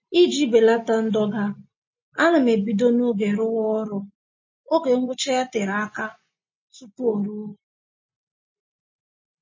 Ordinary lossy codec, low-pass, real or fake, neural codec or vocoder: MP3, 32 kbps; 7.2 kHz; real; none